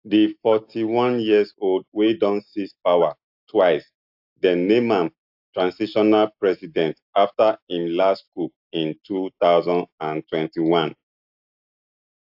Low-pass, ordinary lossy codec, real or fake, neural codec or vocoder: 5.4 kHz; AAC, 48 kbps; real; none